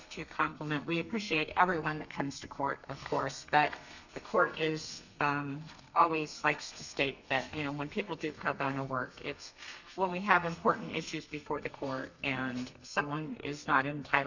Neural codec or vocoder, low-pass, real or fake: codec, 32 kHz, 1.9 kbps, SNAC; 7.2 kHz; fake